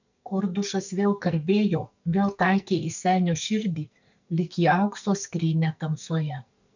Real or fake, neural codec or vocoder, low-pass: fake; codec, 44.1 kHz, 2.6 kbps, SNAC; 7.2 kHz